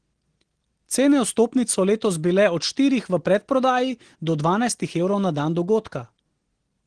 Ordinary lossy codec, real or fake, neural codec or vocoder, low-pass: Opus, 16 kbps; real; none; 9.9 kHz